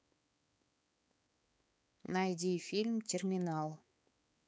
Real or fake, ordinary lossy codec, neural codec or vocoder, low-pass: fake; none; codec, 16 kHz, 4 kbps, X-Codec, HuBERT features, trained on balanced general audio; none